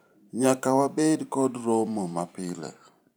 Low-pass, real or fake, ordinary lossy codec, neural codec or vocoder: none; fake; none; vocoder, 44.1 kHz, 128 mel bands every 512 samples, BigVGAN v2